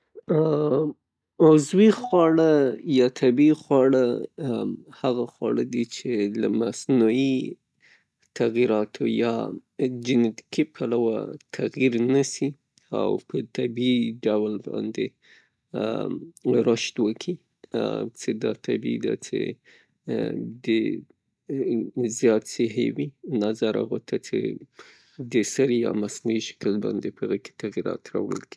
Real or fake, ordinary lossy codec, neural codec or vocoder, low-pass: real; none; none; none